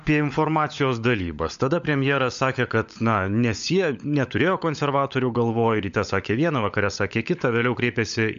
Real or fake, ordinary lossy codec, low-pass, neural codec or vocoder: fake; MP3, 64 kbps; 7.2 kHz; codec, 16 kHz, 16 kbps, FunCodec, trained on LibriTTS, 50 frames a second